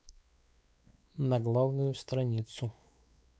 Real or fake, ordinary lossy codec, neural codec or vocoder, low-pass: fake; none; codec, 16 kHz, 4 kbps, X-Codec, WavLM features, trained on Multilingual LibriSpeech; none